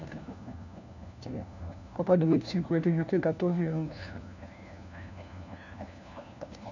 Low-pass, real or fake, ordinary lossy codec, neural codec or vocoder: 7.2 kHz; fake; none; codec, 16 kHz, 1 kbps, FunCodec, trained on LibriTTS, 50 frames a second